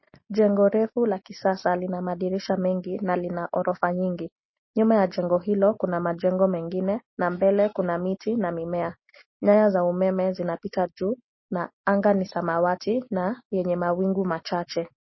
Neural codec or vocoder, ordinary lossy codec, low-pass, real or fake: none; MP3, 24 kbps; 7.2 kHz; real